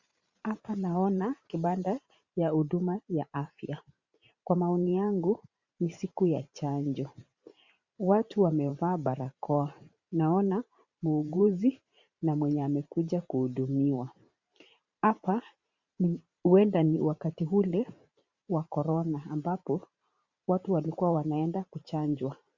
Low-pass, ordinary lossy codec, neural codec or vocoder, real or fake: 7.2 kHz; Opus, 64 kbps; vocoder, 44.1 kHz, 128 mel bands every 512 samples, BigVGAN v2; fake